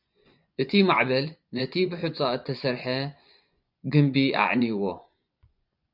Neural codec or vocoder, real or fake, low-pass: vocoder, 24 kHz, 100 mel bands, Vocos; fake; 5.4 kHz